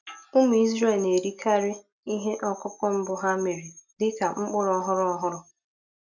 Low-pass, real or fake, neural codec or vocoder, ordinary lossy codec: 7.2 kHz; real; none; none